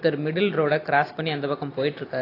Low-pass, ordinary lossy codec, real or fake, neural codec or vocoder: 5.4 kHz; AAC, 24 kbps; real; none